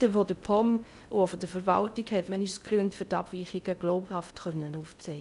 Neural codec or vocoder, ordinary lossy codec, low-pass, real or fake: codec, 16 kHz in and 24 kHz out, 0.6 kbps, FocalCodec, streaming, 4096 codes; none; 10.8 kHz; fake